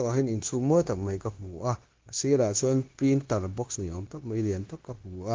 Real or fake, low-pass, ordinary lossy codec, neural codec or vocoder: fake; 7.2 kHz; Opus, 16 kbps; codec, 16 kHz, 0.9 kbps, LongCat-Audio-Codec